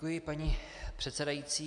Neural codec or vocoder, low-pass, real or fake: none; 10.8 kHz; real